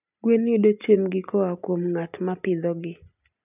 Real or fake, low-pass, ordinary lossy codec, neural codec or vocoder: real; 3.6 kHz; none; none